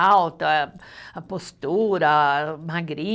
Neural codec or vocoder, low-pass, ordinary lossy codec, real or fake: none; none; none; real